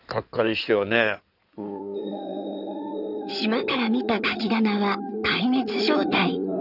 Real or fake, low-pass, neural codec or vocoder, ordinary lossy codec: fake; 5.4 kHz; codec, 16 kHz in and 24 kHz out, 2.2 kbps, FireRedTTS-2 codec; none